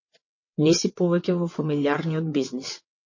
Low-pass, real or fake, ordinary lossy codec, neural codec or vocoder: 7.2 kHz; fake; MP3, 32 kbps; vocoder, 22.05 kHz, 80 mel bands, WaveNeXt